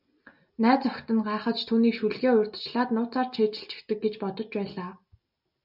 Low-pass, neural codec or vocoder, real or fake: 5.4 kHz; none; real